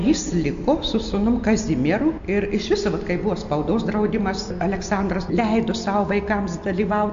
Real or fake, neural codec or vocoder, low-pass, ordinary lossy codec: real; none; 7.2 kHz; AAC, 64 kbps